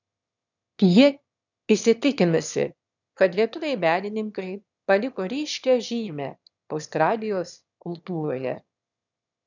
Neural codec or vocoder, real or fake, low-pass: autoencoder, 22.05 kHz, a latent of 192 numbers a frame, VITS, trained on one speaker; fake; 7.2 kHz